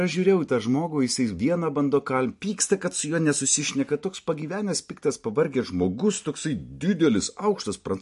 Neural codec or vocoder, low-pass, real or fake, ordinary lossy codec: none; 14.4 kHz; real; MP3, 48 kbps